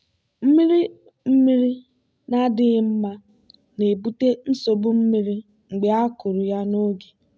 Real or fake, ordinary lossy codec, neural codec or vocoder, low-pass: real; none; none; none